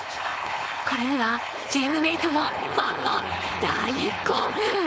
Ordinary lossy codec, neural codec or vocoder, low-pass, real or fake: none; codec, 16 kHz, 4.8 kbps, FACodec; none; fake